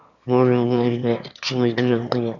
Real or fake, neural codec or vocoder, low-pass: fake; autoencoder, 22.05 kHz, a latent of 192 numbers a frame, VITS, trained on one speaker; 7.2 kHz